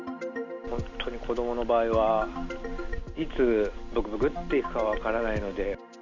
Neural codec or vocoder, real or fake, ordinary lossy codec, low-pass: none; real; none; 7.2 kHz